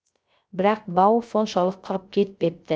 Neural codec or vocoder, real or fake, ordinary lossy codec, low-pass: codec, 16 kHz, 0.3 kbps, FocalCodec; fake; none; none